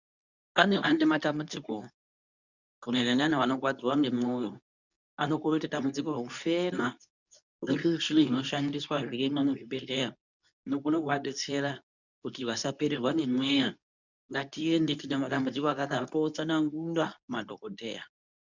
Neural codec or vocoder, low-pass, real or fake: codec, 24 kHz, 0.9 kbps, WavTokenizer, medium speech release version 2; 7.2 kHz; fake